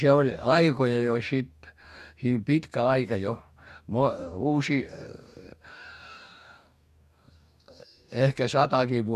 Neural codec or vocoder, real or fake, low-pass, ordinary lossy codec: codec, 44.1 kHz, 2.6 kbps, DAC; fake; 14.4 kHz; none